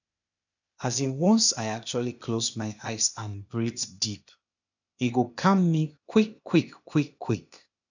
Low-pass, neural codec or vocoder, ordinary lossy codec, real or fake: 7.2 kHz; codec, 16 kHz, 0.8 kbps, ZipCodec; none; fake